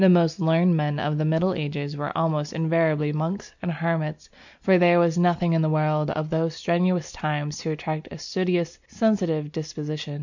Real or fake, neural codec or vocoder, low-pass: real; none; 7.2 kHz